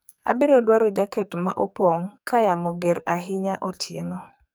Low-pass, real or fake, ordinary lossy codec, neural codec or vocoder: none; fake; none; codec, 44.1 kHz, 2.6 kbps, SNAC